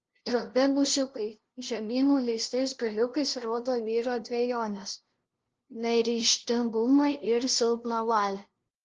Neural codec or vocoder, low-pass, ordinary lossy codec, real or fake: codec, 16 kHz, 0.5 kbps, FunCodec, trained on LibriTTS, 25 frames a second; 7.2 kHz; Opus, 16 kbps; fake